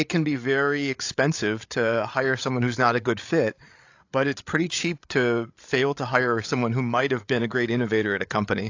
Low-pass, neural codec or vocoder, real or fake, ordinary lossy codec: 7.2 kHz; codec, 16 kHz, 8 kbps, FreqCodec, larger model; fake; AAC, 48 kbps